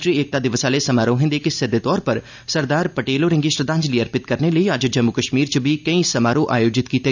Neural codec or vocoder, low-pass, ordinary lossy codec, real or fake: none; 7.2 kHz; none; real